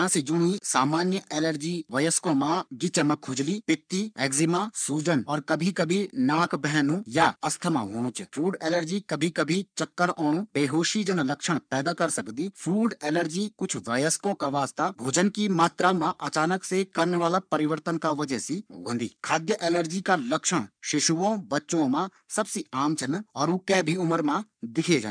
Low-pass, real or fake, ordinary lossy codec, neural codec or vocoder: 9.9 kHz; fake; none; codec, 44.1 kHz, 3.4 kbps, Pupu-Codec